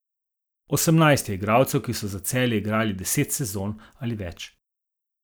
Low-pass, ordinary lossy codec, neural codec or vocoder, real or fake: none; none; none; real